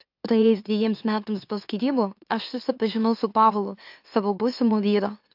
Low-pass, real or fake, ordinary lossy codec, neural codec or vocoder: 5.4 kHz; fake; AAC, 48 kbps; autoencoder, 44.1 kHz, a latent of 192 numbers a frame, MeloTTS